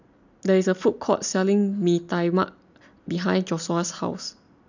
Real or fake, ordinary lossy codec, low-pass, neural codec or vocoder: real; none; 7.2 kHz; none